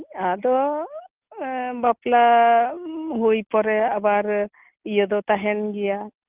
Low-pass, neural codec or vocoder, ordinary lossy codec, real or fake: 3.6 kHz; none; Opus, 32 kbps; real